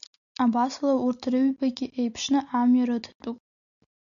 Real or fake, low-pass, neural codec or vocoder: real; 7.2 kHz; none